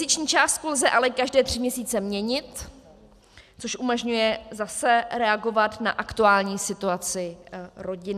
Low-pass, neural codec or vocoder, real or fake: 14.4 kHz; none; real